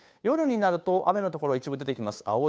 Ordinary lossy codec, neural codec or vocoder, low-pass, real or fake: none; codec, 16 kHz, 2 kbps, FunCodec, trained on Chinese and English, 25 frames a second; none; fake